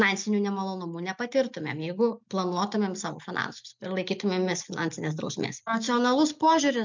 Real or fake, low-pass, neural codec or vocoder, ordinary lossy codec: real; 7.2 kHz; none; MP3, 64 kbps